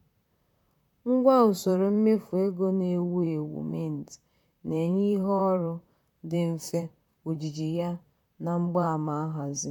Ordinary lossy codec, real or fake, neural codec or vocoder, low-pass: none; fake; vocoder, 44.1 kHz, 128 mel bands, Pupu-Vocoder; 19.8 kHz